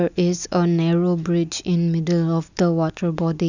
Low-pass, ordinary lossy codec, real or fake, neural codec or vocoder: 7.2 kHz; none; real; none